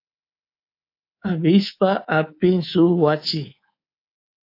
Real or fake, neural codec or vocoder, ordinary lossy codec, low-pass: fake; codec, 24 kHz, 3.1 kbps, DualCodec; AAC, 32 kbps; 5.4 kHz